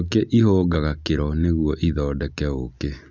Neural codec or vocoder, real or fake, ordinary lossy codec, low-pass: none; real; none; 7.2 kHz